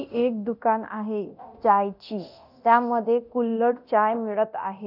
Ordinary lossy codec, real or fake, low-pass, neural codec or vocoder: none; fake; 5.4 kHz; codec, 24 kHz, 0.9 kbps, DualCodec